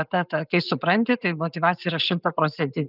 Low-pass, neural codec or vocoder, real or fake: 5.4 kHz; none; real